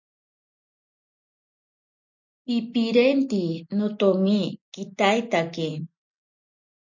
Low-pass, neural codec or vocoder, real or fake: 7.2 kHz; none; real